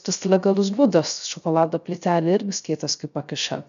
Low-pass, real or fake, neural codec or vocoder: 7.2 kHz; fake; codec, 16 kHz, 0.3 kbps, FocalCodec